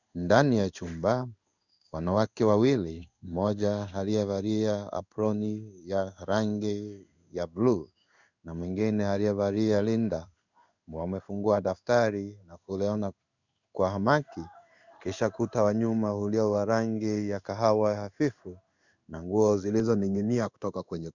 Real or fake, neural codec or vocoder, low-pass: fake; codec, 16 kHz in and 24 kHz out, 1 kbps, XY-Tokenizer; 7.2 kHz